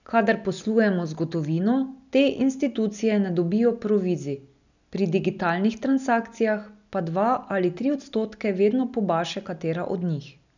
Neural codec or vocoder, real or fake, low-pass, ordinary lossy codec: none; real; 7.2 kHz; none